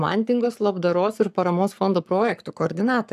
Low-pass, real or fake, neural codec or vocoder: 14.4 kHz; fake; codec, 44.1 kHz, 7.8 kbps, Pupu-Codec